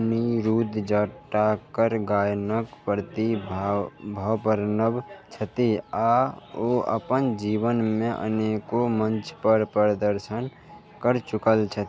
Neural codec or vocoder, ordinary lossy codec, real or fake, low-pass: none; none; real; none